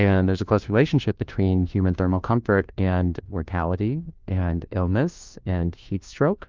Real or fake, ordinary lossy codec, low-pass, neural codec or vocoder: fake; Opus, 32 kbps; 7.2 kHz; codec, 16 kHz, 1 kbps, FunCodec, trained on LibriTTS, 50 frames a second